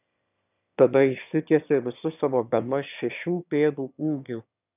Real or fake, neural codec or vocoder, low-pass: fake; autoencoder, 22.05 kHz, a latent of 192 numbers a frame, VITS, trained on one speaker; 3.6 kHz